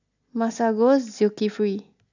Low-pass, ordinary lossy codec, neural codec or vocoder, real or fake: 7.2 kHz; none; none; real